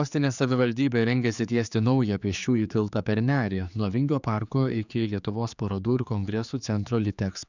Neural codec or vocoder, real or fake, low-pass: codec, 16 kHz, 4 kbps, X-Codec, HuBERT features, trained on general audio; fake; 7.2 kHz